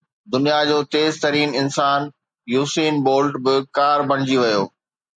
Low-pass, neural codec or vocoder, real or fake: 9.9 kHz; none; real